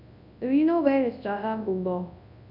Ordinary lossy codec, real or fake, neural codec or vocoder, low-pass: none; fake; codec, 24 kHz, 0.9 kbps, WavTokenizer, large speech release; 5.4 kHz